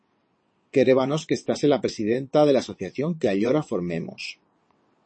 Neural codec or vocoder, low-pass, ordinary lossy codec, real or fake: vocoder, 22.05 kHz, 80 mel bands, WaveNeXt; 9.9 kHz; MP3, 32 kbps; fake